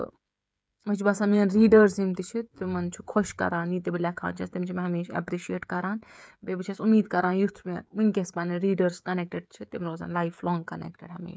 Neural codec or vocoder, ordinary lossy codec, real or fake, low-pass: codec, 16 kHz, 16 kbps, FreqCodec, smaller model; none; fake; none